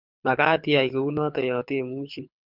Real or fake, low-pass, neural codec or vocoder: fake; 5.4 kHz; codec, 24 kHz, 6 kbps, HILCodec